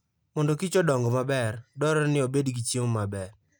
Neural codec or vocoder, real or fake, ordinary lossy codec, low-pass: none; real; none; none